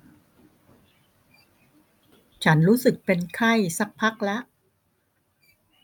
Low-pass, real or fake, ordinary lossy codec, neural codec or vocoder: 19.8 kHz; real; none; none